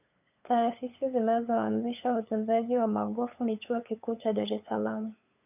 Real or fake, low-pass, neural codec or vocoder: fake; 3.6 kHz; codec, 16 kHz, 8 kbps, FunCodec, trained on LibriTTS, 25 frames a second